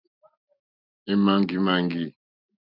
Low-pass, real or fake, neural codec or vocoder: 5.4 kHz; real; none